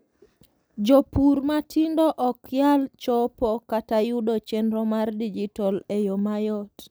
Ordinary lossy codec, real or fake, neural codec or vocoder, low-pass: none; real; none; none